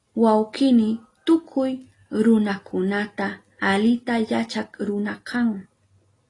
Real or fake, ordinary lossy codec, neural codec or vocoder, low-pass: real; AAC, 32 kbps; none; 10.8 kHz